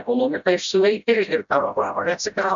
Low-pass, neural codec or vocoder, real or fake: 7.2 kHz; codec, 16 kHz, 0.5 kbps, FreqCodec, smaller model; fake